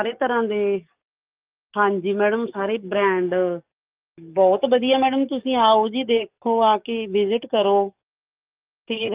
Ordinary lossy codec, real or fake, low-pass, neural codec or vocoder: Opus, 32 kbps; fake; 3.6 kHz; codec, 44.1 kHz, 7.8 kbps, DAC